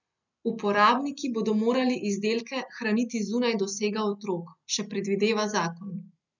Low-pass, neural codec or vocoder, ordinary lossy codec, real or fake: 7.2 kHz; none; none; real